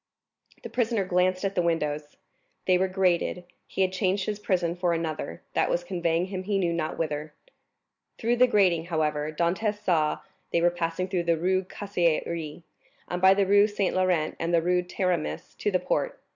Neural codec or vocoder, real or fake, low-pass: none; real; 7.2 kHz